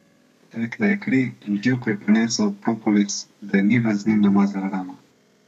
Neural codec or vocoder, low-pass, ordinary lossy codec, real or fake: codec, 32 kHz, 1.9 kbps, SNAC; 14.4 kHz; none; fake